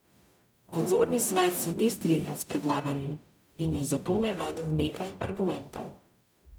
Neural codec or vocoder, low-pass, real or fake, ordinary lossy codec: codec, 44.1 kHz, 0.9 kbps, DAC; none; fake; none